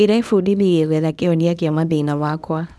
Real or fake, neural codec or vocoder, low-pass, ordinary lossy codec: fake; codec, 24 kHz, 0.9 kbps, WavTokenizer, small release; none; none